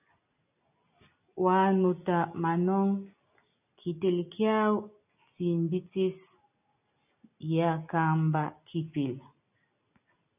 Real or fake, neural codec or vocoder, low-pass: real; none; 3.6 kHz